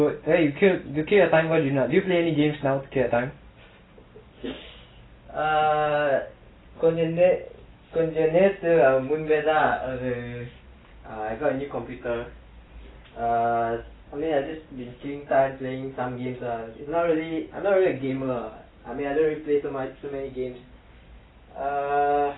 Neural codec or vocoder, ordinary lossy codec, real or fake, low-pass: none; AAC, 16 kbps; real; 7.2 kHz